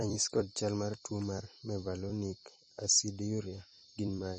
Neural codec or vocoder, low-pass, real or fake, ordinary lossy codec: vocoder, 44.1 kHz, 128 mel bands every 256 samples, BigVGAN v2; 10.8 kHz; fake; MP3, 32 kbps